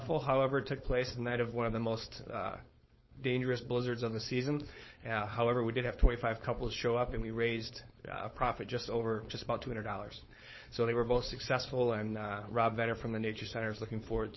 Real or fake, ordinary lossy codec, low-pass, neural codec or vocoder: fake; MP3, 24 kbps; 7.2 kHz; codec, 16 kHz, 4.8 kbps, FACodec